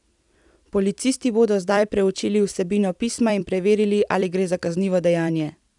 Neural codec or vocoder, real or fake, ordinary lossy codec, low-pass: vocoder, 44.1 kHz, 128 mel bands every 256 samples, BigVGAN v2; fake; none; 10.8 kHz